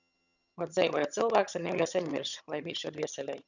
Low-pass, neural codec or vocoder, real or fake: 7.2 kHz; vocoder, 22.05 kHz, 80 mel bands, HiFi-GAN; fake